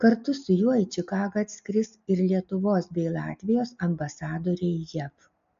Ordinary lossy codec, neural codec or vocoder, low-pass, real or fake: AAC, 64 kbps; none; 7.2 kHz; real